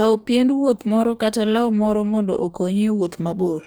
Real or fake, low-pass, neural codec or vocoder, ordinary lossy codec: fake; none; codec, 44.1 kHz, 2.6 kbps, DAC; none